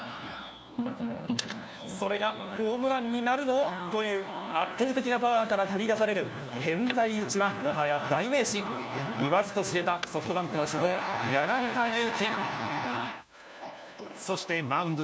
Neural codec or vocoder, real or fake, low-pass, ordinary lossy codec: codec, 16 kHz, 1 kbps, FunCodec, trained on LibriTTS, 50 frames a second; fake; none; none